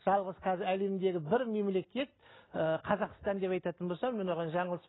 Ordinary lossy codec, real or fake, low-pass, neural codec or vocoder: AAC, 16 kbps; real; 7.2 kHz; none